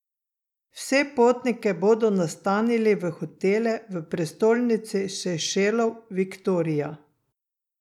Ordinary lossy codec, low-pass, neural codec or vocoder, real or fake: none; 19.8 kHz; none; real